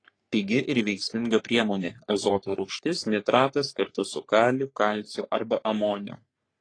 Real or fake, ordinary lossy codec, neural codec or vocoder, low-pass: fake; AAC, 32 kbps; codec, 44.1 kHz, 3.4 kbps, Pupu-Codec; 9.9 kHz